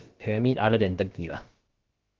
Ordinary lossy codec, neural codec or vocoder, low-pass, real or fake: Opus, 16 kbps; codec, 16 kHz, about 1 kbps, DyCAST, with the encoder's durations; 7.2 kHz; fake